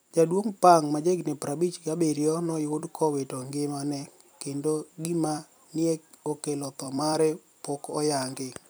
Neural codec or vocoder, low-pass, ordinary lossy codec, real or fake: vocoder, 44.1 kHz, 128 mel bands every 256 samples, BigVGAN v2; none; none; fake